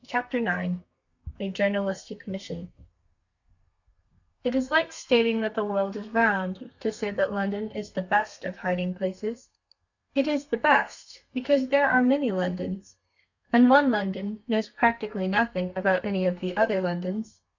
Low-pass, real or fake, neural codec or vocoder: 7.2 kHz; fake; codec, 32 kHz, 1.9 kbps, SNAC